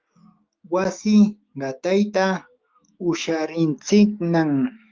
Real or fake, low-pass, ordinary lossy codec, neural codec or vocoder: fake; 7.2 kHz; Opus, 32 kbps; autoencoder, 48 kHz, 128 numbers a frame, DAC-VAE, trained on Japanese speech